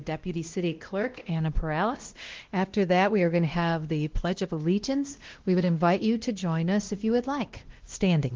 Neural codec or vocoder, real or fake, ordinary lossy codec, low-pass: codec, 16 kHz, 1 kbps, X-Codec, WavLM features, trained on Multilingual LibriSpeech; fake; Opus, 16 kbps; 7.2 kHz